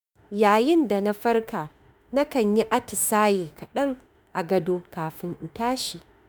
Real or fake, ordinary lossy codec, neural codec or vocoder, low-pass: fake; none; autoencoder, 48 kHz, 32 numbers a frame, DAC-VAE, trained on Japanese speech; none